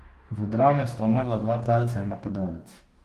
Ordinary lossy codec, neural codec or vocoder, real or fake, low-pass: Opus, 24 kbps; codec, 44.1 kHz, 2.6 kbps, DAC; fake; 14.4 kHz